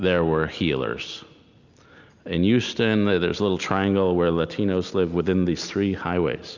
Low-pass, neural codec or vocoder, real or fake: 7.2 kHz; none; real